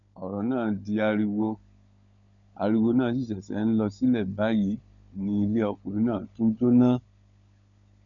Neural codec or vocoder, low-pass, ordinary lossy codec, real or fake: codec, 16 kHz, 16 kbps, FunCodec, trained on LibriTTS, 50 frames a second; 7.2 kHz; none; fake